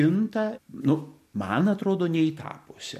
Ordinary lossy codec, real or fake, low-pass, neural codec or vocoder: MP3, 64 kbps; real; 14.4 kHz; none